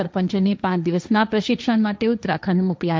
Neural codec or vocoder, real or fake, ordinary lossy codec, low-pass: codec, 16 kHz, 1.1 kbps, Voila-Tokenizer; fake; none; none